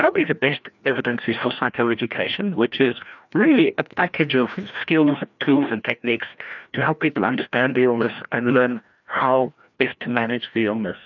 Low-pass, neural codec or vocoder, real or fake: 7.2 kHz; codec, 16 kHz, 1 kbps, FreqCodec, larger model; fake